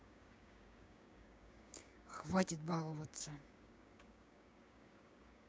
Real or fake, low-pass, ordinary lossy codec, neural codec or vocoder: fake; none; none; codec, 16 kHz, 6 kbps, DAC